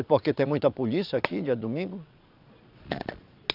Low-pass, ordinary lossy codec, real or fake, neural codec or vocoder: 5.4 kHz; none; fake; vocoder, 22.05 kHz, 80 mel bands, Vocos